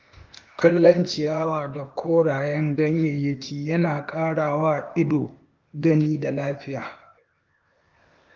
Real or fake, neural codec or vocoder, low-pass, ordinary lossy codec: fake; codec, 16 kHz, 0.8 kbps, ZipCodec; 7.2 kHz; Opus, 32 kbps